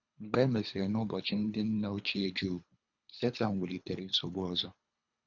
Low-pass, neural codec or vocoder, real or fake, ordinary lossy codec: 7.2 kHz; codec, 24 kHz, 3 kbps, HILCodec; fake; none